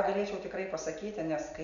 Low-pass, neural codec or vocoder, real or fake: 7.2 kHz; none; real